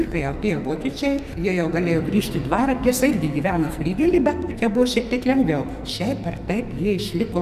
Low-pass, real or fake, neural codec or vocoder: 14.4 kHz; fake; codec, 44.1 kHz, 2.6 kbps, SNAC